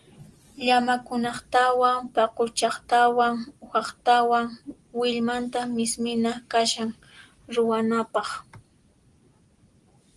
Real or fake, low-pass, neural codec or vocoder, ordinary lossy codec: real; 10.8 kHz; none; Opus, 24 kbps